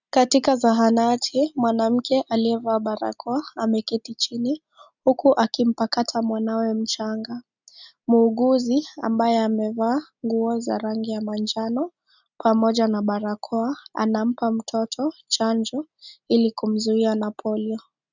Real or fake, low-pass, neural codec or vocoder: real; 7.2 kHz; none